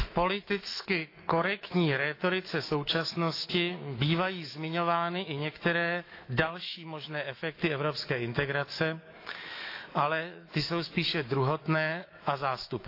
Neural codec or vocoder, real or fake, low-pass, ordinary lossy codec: autoencoder, 48 kHz, 128 numbers a frame, DAC-VAE, trained on Japanese speech; fake; 5.4 kHz; AAC, 32 kbps